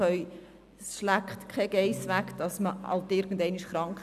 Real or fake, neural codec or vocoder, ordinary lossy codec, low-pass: real; none; none; 14.4 kHz